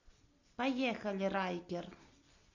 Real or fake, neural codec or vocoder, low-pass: real; none; 7.2 kHz